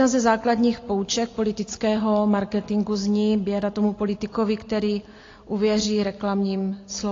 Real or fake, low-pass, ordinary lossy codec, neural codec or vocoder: real; 7.2 kHz; AAC, 32 kbps; none